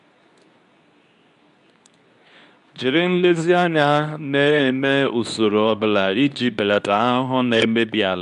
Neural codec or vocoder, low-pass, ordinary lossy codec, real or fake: codec, 24 kHz, 0.9 kbps, WavTokenizer, medium speech release version 2; 10.8 kHz; none; fake